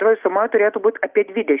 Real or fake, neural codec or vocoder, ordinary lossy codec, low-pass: real; none; Opus, 32 kbps; 3.6 kHz